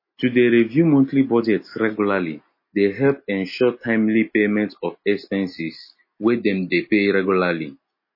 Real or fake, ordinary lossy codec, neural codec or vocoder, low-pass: real; MP3, 24 kbps; none; 5.4 kHz